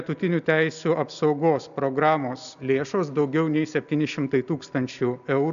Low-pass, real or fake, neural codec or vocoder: 7.2 kHz; real; none